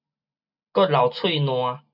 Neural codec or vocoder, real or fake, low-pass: none; real; 5.4 kHz